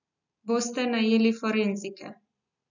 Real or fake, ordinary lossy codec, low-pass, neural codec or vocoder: real; none; 7.2 kHz; none